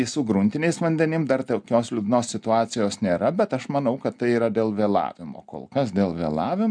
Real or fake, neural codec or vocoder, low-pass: real; none; 9.9 kHz